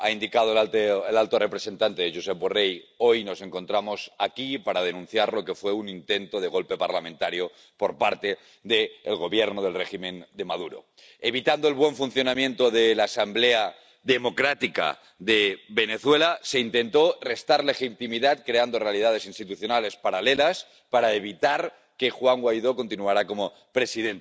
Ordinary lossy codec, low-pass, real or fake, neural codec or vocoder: none; none; real; none